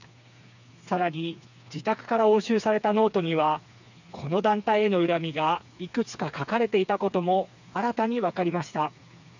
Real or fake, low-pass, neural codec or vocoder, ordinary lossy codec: fake; 7.2 kHz; codec, 16 kHz, 4 kbps, FreqCodec, smaller model; none